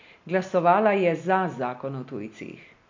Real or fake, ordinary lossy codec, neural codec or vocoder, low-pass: real; MP3, 48 kbps; none; 7.2 kHz